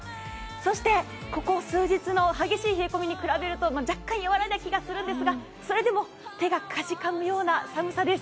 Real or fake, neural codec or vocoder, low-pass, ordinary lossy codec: real; none; none; none